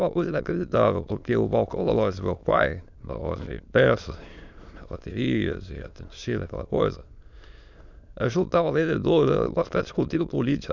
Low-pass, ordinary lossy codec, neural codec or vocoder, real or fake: 7.2 kHz; none; autoencoder, 22.05 kHz, a latent of 192 numbers a frame, VITS, trained on many speakers; fake